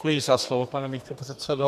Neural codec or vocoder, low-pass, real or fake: codec, 32 kHz, 1.9 kbps, SNAC; 14.4 kHz; fake